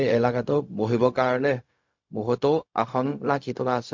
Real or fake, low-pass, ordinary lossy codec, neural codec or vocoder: fake; 7.2 kHz; MP3, 48 kbps; codec, 16 kHz, 0.4 kbps, LongCat-Audio-Codec